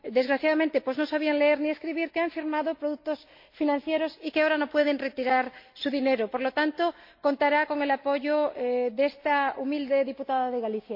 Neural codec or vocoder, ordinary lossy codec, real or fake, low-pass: none; MP3, 32 kbps; real; 5.4 kHz